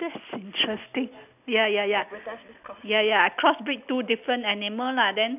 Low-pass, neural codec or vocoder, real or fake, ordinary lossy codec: 3.6 kHz; none; real; none